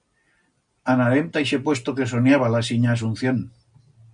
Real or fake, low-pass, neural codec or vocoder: real; 9.9 kHz; none